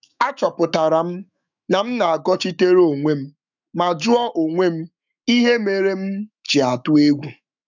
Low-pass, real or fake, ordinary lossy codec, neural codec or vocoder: 7.2 kHz; fake; none; autoencoder, 48 kHz, 128 numbers a frame, DAC-VAE, trained on Japanese speech